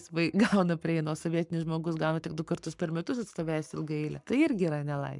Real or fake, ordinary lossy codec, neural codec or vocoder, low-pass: fake; MP3, 96 kbps; codec, 44.1 kHz, 7.8 kbps, Pupu-Codec; 10.8 kHz